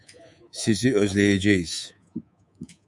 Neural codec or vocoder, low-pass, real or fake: codec, 24 kHz, 3.1 kbps, DualCodec; 10.8 kHz; fake